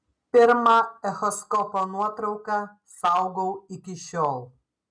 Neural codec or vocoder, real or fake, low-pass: none; real; 9.9 kHz